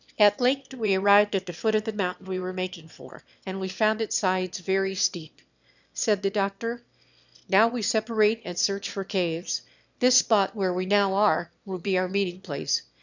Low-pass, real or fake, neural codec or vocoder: 7.2 kHz; fake; autoencoder, 22.05 kHz, a latent of 192 numbers a frame, VITS, trained on one speaker